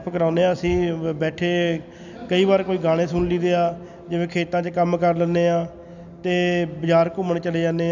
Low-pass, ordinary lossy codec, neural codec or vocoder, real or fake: 7.2 kHz; none; none; real